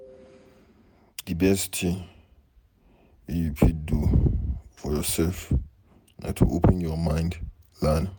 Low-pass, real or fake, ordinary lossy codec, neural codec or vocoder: none; fake; none; vocoder, 48 kHz, 128 mel bands, Vocos